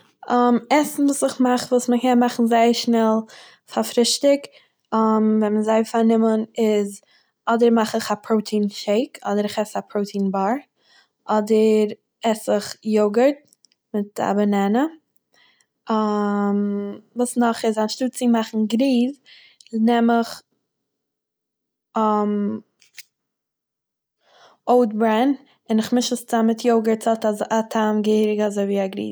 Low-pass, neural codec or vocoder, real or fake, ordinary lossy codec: none; none; real; none